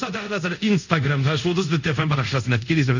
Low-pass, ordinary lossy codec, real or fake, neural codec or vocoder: 7.2 kHz; none; fake; codec, 24 kHz, 0.5 kbps, DualCodec